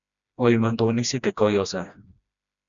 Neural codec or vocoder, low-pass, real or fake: codec, 16 kHz, 1 kbps, FreqCodec, smaller model; 7.2 kHz; fake